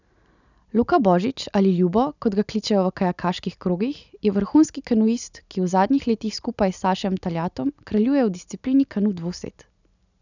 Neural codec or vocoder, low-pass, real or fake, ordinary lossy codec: none; 7.2 kHz; real; none